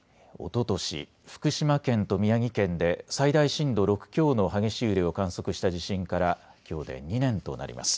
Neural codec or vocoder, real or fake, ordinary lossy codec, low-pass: none; real; none; none